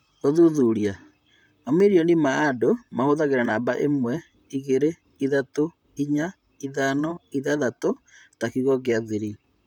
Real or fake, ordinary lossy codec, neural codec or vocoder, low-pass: fake; none; vocoder, 44.1 kHz, 128 mel bands, Pupu-Vocoder; 19.8 kHz